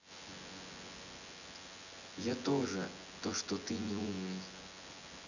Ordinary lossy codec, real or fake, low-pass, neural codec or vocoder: none; fake; 7.2 kHz; vocoder, 24 kHz, 100 mel bands, Vocos